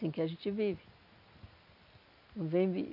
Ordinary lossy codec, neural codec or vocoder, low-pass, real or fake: none; none; 5.4 kHz; real